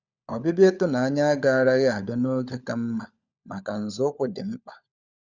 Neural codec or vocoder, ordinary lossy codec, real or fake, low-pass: codec, 16 kHz, 16 kbps, FunCodec, trained on LibriTTS, 50 frames a second; Opus, 64 kbps; fake; 7.2 kHz